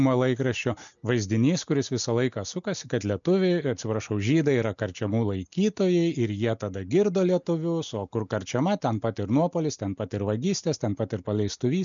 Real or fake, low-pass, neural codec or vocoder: real; 7.2 kHz; none